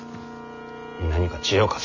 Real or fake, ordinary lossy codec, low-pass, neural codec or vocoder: real; none; 7.2 kHz; none